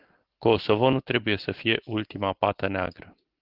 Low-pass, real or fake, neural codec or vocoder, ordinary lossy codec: 5.4 kHz; real; none; Opus, 16 kbps